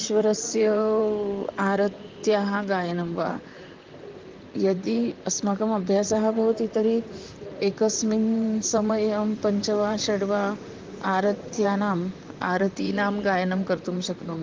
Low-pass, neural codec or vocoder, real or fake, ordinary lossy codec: 7.2 kHz; vocoder, 44.1 kHz, 128 mel bands, Pupu-Vocoder; fake; Opus, 24 kbps